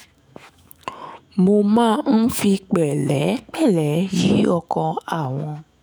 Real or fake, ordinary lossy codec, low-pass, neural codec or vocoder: fake; none; none; autoencoder, 48 kHz, 128 numbers a frame, DAC-VAE, trained on Japanese speech